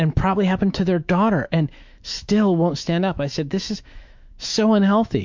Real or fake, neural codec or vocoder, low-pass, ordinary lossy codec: fake; autoencoder, 48 kHz, 128 numbers a frame, DAC-VAE, trained on Japanese speech; 7.2 kHz; MP3, 64 kbps